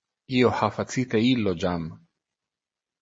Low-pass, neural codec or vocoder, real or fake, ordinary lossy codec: 9.9 kHz; none; real; MP3, 32 kbps